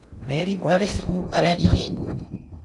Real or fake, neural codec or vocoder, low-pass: fake; codec, 16 kHz in and 24 kHz out, 0.6 kbps, FocalCodec, streaming, 2048 codes; 10.8 kHz